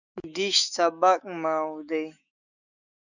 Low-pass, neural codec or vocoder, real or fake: 7.2 kHz; autoencoder, 48 kHz, 128 numbers a frame, DAC-VAE, trained on Japanese speech; fake